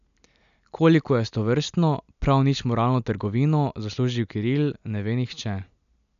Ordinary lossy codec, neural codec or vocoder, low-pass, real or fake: none; none; 7.2 kHz; real